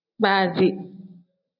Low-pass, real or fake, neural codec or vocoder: 5.4 kHz; real; none